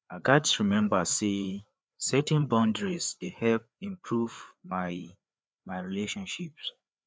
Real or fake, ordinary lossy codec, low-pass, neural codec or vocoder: fake; none; none; codec, 16 kHz, 4 kbps, FreqCodec, larger model